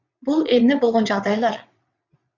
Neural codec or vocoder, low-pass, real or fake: vocoder, 22.05 kHz, 80 mel bands, WaveNeXt; 7.2 kHz; fake